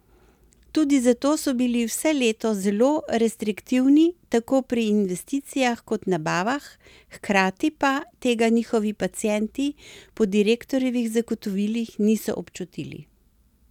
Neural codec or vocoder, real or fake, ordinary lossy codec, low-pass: none; real; none; 19.8 kHz